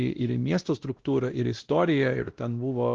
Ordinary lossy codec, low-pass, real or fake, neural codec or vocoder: Opus, 16 kbps; 7.2 kHz; fake; codec, 16 kHz, 1 kbps, X-Codec, WavLM features, trained on Multilingual LibriSpeech